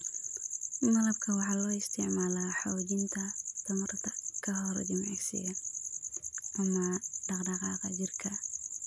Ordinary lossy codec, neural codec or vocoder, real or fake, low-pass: none; none; real; none